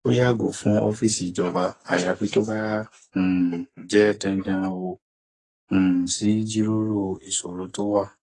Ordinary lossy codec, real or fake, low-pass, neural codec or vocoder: AAC, 32 kbps; fake; 10.8 kHz; codec, 44.1 kHz, 2.6 kbps, SNAC